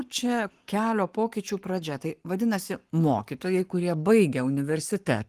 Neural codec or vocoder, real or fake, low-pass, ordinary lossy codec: codec, 44.1 kHz, 7.8 kbps, Pupu-Codec; fake; 14.4 kHz; Opus, 24 kbps